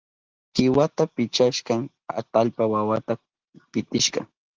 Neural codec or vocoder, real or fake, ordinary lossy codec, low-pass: none; real; Opus, 32 kbps; 7.2 kHz